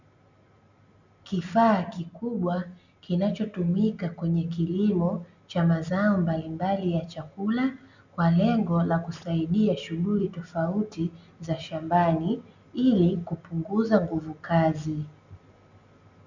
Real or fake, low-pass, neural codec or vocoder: real; 7.2 kHz; none